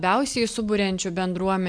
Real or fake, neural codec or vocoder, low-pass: real; none; 9.9 kHz